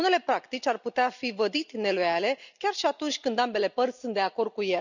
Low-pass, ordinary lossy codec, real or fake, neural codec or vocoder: 7.2 kHz; none; real; none